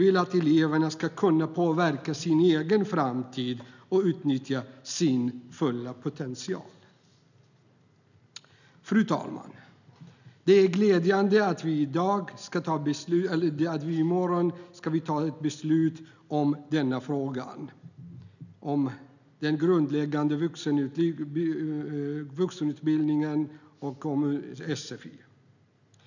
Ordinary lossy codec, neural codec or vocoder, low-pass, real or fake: none; none; 7.2 kHz; real